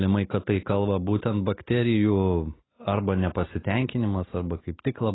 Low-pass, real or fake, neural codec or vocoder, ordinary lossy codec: 7.2 kHz; real; none; AAC, 16 kbps